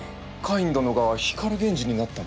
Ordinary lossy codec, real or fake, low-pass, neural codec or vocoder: none; real; none; none